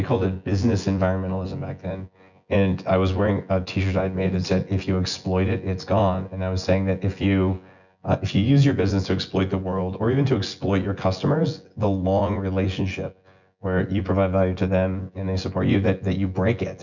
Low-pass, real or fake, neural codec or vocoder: 7.2 kHz; fake; vocoder, 24 kHz, 100 mel bands, Vocos